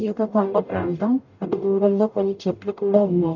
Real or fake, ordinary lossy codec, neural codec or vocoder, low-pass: fake; none; codec, 44.1 kHz, 0.9 kbps, DAC; 7.2 kHz